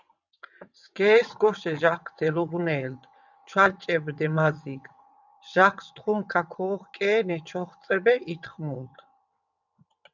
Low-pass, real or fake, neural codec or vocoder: 7.2 kHz; fake; vocoder, 22.05 kHz, 80 mel bands, WaveNeXt